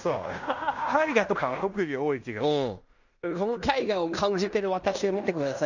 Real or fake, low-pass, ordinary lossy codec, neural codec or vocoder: fake; 7.2 kHz; none; codec, 16 kHz in and 24 kHz out, 0.9 kbps, LongCat-Audio-Codec, fine tuned four codebook decoder